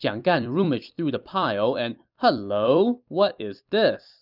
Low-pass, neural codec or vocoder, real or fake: 5.4 kHz; vocoder, 44.1 kHz, 128 mel bands every 256 samples, BigVGAN v2; fake